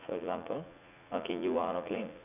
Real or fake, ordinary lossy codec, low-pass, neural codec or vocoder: fake; none; 3.6 kHz; vocoder, 44.1 kHz, 80 mel bands, Vocos